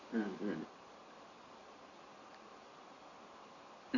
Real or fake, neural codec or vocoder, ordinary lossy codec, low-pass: fake; vocoder, 44.1 kHz, 128 mel bands every 256 samples, BigVGAN v2; none; 7.2 kHz